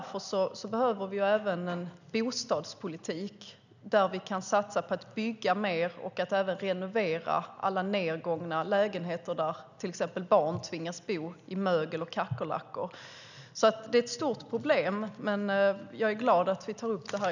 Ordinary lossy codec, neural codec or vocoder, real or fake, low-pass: none; none; real; 7.2 kHz